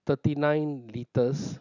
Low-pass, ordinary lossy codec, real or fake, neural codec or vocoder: 7.2 kHz; none; real; none